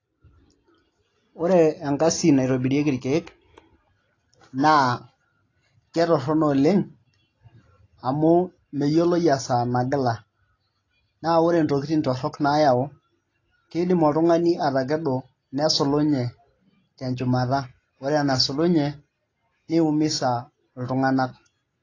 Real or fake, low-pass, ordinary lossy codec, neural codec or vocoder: real; 7.2 kHz; AAC, 32 kbps; none